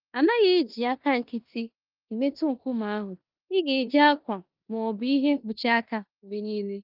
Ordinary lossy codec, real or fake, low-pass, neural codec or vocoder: Opus, 32 kbps; fake; 5.4 kHz; codec, 16 kHz in and 24 kHz out, 0.9 kbps, LongCat-Audio-Codec, four codebook decoder